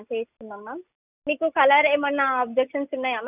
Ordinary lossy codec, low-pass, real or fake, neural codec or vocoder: none; 3.6 kHz; real; none